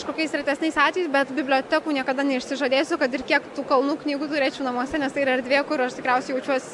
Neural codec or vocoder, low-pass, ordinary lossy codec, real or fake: none; 10.8 kHz; MP3, 96 kbps; real